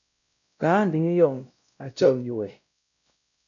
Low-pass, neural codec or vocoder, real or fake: 7.2 kHz; codec, 16 kHz, 0.5 kbps, X-Codec, WavLM features, trained on Multilingual LibriSpeech; fake